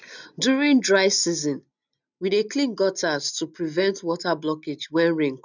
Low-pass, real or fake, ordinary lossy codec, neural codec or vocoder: 7.2 kHz; real; none; none